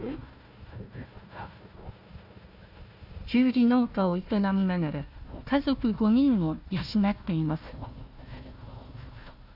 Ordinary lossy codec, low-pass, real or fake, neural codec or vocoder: none; 5.4 kHz; fake; codec, 16 kHz, 1 kbps, FunCodec, trained on Chinese and English, 50 frames a second